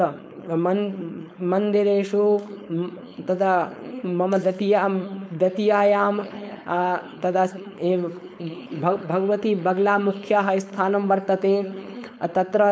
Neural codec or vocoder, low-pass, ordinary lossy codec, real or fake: codec, 16 kHz, 4.8 kbps, FACodec; none; none; fake